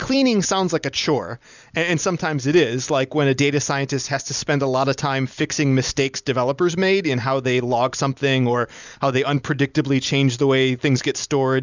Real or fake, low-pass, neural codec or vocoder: real; 7.2 kHz; none